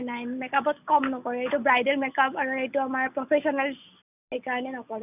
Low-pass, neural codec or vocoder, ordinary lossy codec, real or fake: 3.6 kHz; none; none; real